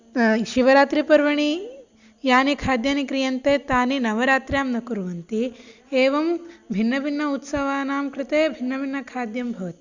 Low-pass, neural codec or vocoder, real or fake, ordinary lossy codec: 7.2 kHz; none; real; Opus, 64 kbps